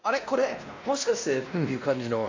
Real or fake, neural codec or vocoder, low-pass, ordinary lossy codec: fake; codec, 16 kHz, 1 kbps, X-Codec, WavLM features, trained on Multilingual LibriSpeech; 7.2 kHz; AAC, 48 kbps